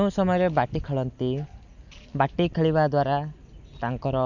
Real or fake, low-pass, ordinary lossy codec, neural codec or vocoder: real; 7.2 kHz; none; none